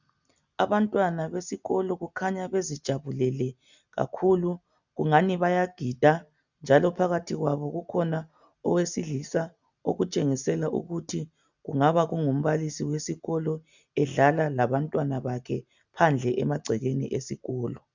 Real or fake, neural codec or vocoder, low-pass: real; none; 7.2 kHz